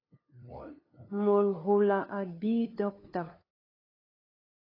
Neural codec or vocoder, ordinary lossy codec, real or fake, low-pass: codec, 16 kHz, 2 kbps, FunCodec, trained on LibriTTS, 25 frames a second; AAC, 24 kbps; fake; 5.4 kHz